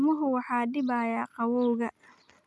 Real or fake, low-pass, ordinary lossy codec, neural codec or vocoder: real; none; none; none